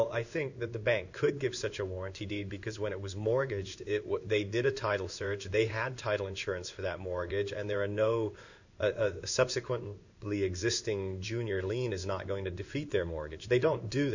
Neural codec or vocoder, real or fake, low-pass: codec, 16 kHz in and 24 kHz out, 1 kbps, XY-Tokenizer; fake; 7.2 kHz